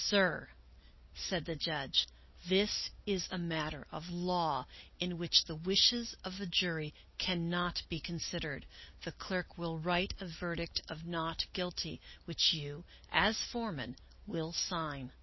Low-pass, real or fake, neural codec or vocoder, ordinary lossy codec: 7.2 kHz; real; none; MP3, 24 kbps